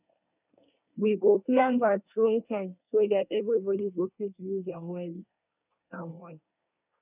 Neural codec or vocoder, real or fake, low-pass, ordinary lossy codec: codec, 24 kHz, 1 kbps, SNAC; fake; 3.6 kHz; none